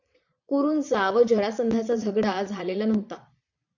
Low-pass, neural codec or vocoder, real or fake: 7.2 kHz; vocoder, 22.05 kHz, 80 mel bands, Vocos; fake